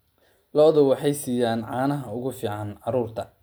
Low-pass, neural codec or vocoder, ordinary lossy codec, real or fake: none; none; none; real